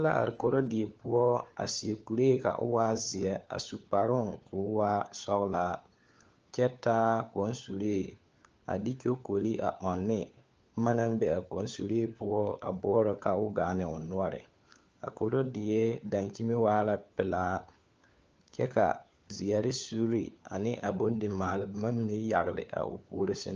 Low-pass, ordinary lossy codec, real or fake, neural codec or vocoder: 7.2 kHz; Opus, 24 kbps; fake; codec, 16 kHz, 4 kbps, FunCodec, trained on LibriTTS, 50 frames a second